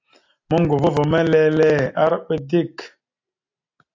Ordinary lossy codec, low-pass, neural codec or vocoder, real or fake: AAC, 48 kbps; 7.2 kHz; none; real